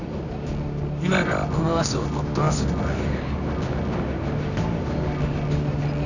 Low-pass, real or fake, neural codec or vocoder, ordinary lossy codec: 7.2 kHz; fake; codec, 24 kHz, 0.9 kbps, WavTokenizer, medium music audio release; none